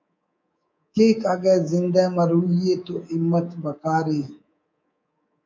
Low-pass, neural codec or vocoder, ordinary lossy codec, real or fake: 7.2 kHz; codec, 24 kHz, 3.1 kbps, DualCodec; MP3, 48 kbps; fake